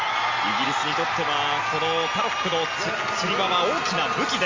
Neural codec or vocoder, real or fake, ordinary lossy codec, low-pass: none; real; Opus, 32 kbps; 7.2 kHz